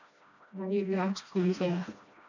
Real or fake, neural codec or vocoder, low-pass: fake; codec, 16 kHz, 1 kbps, FreqCodec, smaller model; 7.2 kHz